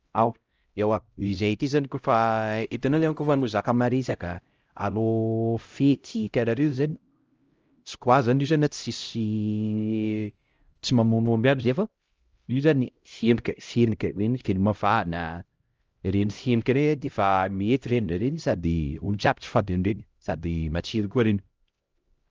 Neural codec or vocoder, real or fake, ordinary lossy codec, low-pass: codec, 16 kHz, 0.5 kbps, X-Codec, HuBERT features, trained on LibriSpeech; fake; Opus, 24 kbps; 7.2 kHz